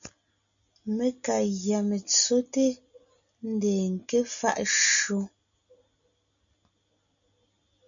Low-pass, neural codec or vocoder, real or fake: 7.2 kHz; none; real